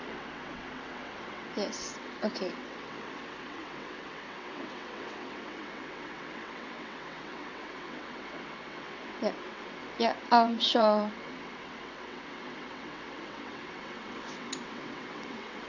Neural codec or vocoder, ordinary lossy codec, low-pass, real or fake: vocoder, 22.05 kHz, 80 mel bands, WaveNeXt; none; 7.2 kHz; fake